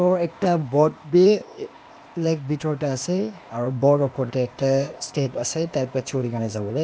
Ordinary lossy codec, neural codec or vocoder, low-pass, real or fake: none; codec, 16 kHz, 0.8 kbps, ZipCodec; none; fake